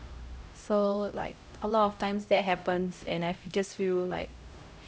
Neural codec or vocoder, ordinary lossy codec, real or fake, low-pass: codec, 16 kHz, 0.5 kbps, X-Codec, HuBERT features, trained on LibriSpeech; none; fake; none